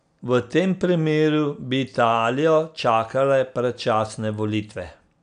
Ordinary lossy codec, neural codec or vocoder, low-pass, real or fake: none; none; 9.9 kHz; real